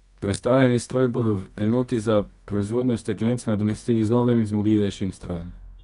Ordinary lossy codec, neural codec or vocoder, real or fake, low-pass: none; codec, 24 kHz, 0.9 kbps, WavTokenizer, medium music audio release; fake; 10.8 kHz